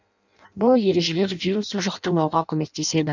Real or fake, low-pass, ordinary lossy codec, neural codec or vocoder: fake; 7.2 kHz; none; codec, 16 kHz in and 24 kHz out, 0.6 kbps, FireRedTTS-2 codec